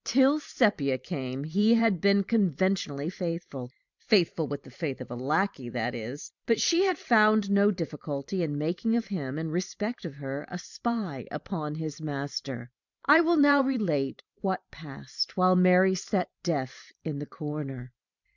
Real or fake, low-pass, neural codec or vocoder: fake; 7.2 kHz; vocoder, 44.1 kHz, 128 mel bands every 512 samples, BigVGAN v2